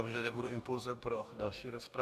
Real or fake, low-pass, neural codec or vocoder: fake; 14.4 kHz; codec, 44.1 kHz, 2.6 kbps, DAC